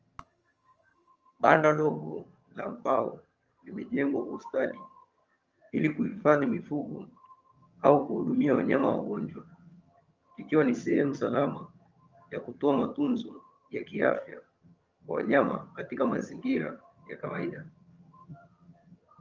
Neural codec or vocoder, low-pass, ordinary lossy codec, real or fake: vocoder, 22.05 kHz, 80 mel bands, HiFi-GAN; 7.2 kHz; Opus, 24 kbps; fake